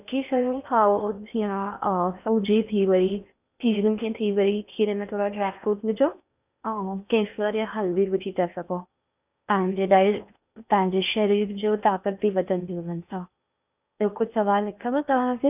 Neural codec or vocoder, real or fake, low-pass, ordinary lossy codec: codec, 16 kHz in and 24 kHz out, 0.8 kbps, FocalCodec, streaming, 65536 codes; fake; 3.6 kHz; none